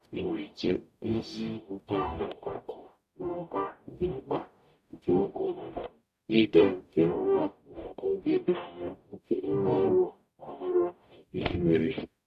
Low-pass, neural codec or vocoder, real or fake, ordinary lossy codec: 14.4 kHz; codec, 44.1 kHz, 0.9 kbps, DAC; fake; none